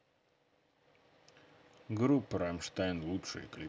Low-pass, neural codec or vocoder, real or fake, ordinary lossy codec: none; none; real; none